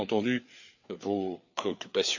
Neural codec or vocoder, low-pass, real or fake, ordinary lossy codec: codec, 16 kHz, 4 kbps, FreqCodec, larger model; 7.2 kHz; fake; none